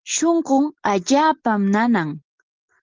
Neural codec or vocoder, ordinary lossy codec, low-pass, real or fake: none; Opus, 16 kbps; 7.2 kHz; real